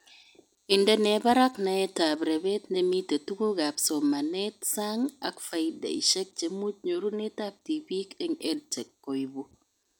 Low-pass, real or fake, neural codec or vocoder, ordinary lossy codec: none; real; none; none